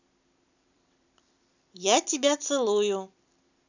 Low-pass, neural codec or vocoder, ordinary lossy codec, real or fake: 7.2 kHz; vocoder, 44.1 kHz, 128 mel bands every 256 samples, BigVGAN v2; none; fake